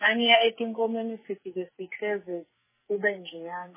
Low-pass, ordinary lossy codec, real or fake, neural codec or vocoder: 3.6 kHz; MP3, 16 kbps; fake; codec, 44.1 kHz, 2.6 kbps, SNAC